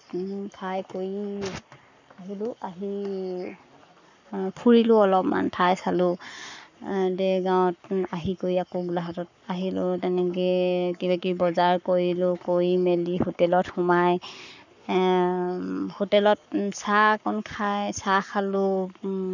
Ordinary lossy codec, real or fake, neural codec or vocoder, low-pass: none; fake; codec, 44.1 kHz, 7.8 kbps, Pupu-Codec; 7.2 kHz